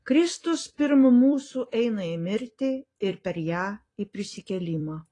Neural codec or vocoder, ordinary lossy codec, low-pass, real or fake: vocoder, 44.1 kHz, 128 mel bands every 256 samples, BigVGAN v2; AAC, 32 kbps; 10.8 kHz; fake